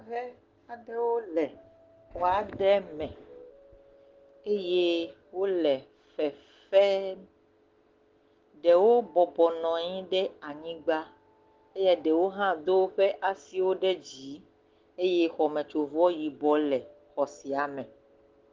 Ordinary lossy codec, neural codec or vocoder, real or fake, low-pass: Opus, 16 kbps; none; real; 7.2 kHz